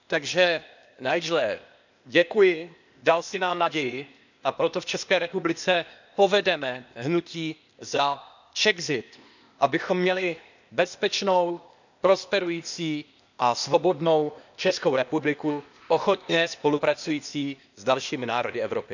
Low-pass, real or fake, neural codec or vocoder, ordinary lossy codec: 7.2 kHz; fake; codec, 16 kHz, 0.8 kbps, ZipCodec; none